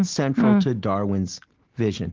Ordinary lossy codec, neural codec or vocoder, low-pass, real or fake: Opus, 16 kbps; none; 7.2 kHz; real